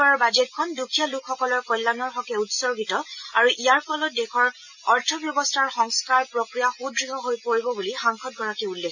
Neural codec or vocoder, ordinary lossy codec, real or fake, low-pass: none; none; real; 7.2 kHz